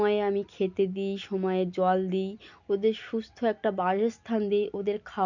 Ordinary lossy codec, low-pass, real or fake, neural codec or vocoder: none; 7.2 kHz; real; none